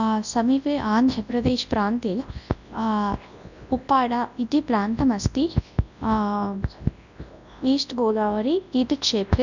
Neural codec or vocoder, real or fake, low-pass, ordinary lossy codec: codec, 24 kHz, 0.9 kbps, WavTokenizer, large speech release; fake; 7.2 kHz; none